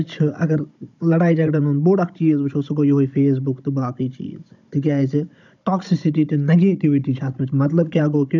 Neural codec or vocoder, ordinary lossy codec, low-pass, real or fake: codec, 16 kHz, 16 kbps, FunCodec, trained on Chinese and English, 50 frames a second; none; 7.2 kHz; fake